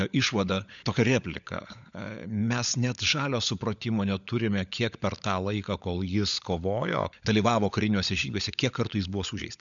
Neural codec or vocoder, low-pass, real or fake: codec, 16 kHz, 16 kbps, FunCodec, trained on LibriTTS, 50 frames a second; 7.2 kHz; fake